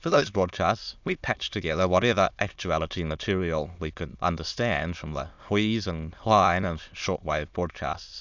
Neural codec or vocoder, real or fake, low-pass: autoencoder, 22.05 kHz, a latent of 192 numbers a frame, VITS, trained on many speakers; fake; 7.2 kHz